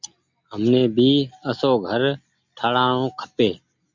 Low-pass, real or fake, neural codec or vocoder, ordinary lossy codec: 7.2 kHz; real; none; MP3, 64 kbps